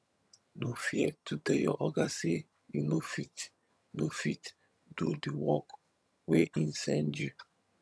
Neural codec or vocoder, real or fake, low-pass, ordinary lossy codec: vocoder, 22.05 kHz, 80 mel bands, HiFi-GAN; fake; none; none